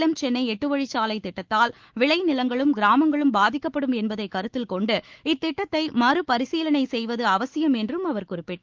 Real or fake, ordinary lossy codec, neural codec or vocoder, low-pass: fake; Opus, 16 kbps; autoencoder, 48 kHz, 128 numbers a frame, DAC-VAE, trained on Japanese speech; 7.2 kHz